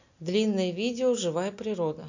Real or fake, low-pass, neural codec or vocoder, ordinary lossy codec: real; 7.2 kHz; none; none